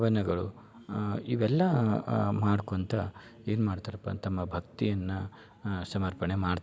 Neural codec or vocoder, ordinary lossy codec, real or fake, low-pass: none; none; real; none